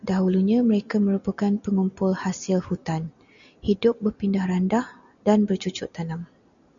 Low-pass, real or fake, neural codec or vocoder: 7.2 kHz; real; none